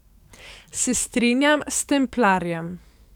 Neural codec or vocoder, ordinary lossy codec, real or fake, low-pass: codec, 44.1 kHz, 7.8 kbps, DAC; none; fake; 19.8 kHz